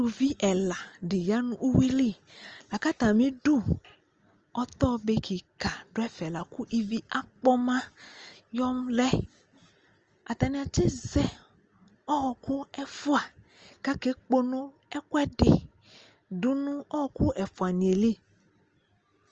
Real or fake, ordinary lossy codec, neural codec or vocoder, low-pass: real; Opus, 24 kbps; none; 7.2 kHz